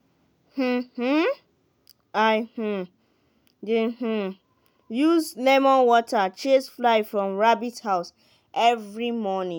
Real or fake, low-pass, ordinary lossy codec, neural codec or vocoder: real; none; none; none